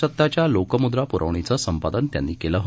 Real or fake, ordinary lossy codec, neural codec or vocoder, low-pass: real; none; none; none